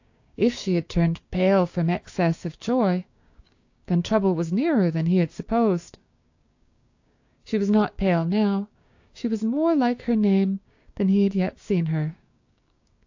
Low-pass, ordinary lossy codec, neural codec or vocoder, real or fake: 7.2 kHz; AAC, 48 kbps; codec, 16 kHz, 6 kbps, DAC; fake